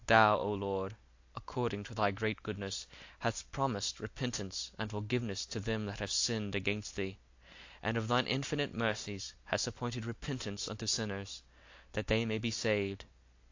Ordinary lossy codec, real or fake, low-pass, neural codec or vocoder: AAC, 48 kbps; real; 7.2 kHz; none